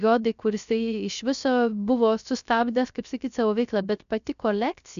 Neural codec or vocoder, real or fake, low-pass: codec, 16 kHz, 0.3 kbps, FocalCodec; fake; 7.2 kHz